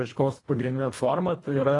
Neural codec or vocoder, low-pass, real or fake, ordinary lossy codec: codec, 24 kHz, 1.5 kbps, HILCodec; 10.8 kHz; fake; AAC, 32 kbps